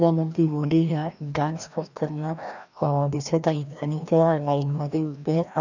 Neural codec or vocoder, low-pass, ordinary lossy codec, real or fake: codec, 16 kHz, 1 kbps, FreqCodec, larger model; 7.2 kHz; none; fake